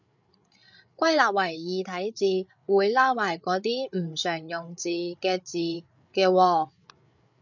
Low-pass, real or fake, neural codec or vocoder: 7.2 kHz; fake; codec, 16 kHz, 8 kbps, FreqCodec, larger model